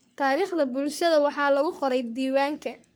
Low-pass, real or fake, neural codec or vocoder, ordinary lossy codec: none; fake; codec, 44.1 kHz, 3.4 kbps, Pupu-Codec; none